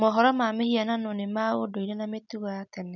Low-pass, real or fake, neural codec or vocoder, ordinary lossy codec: 7.2 kHz; real; none; none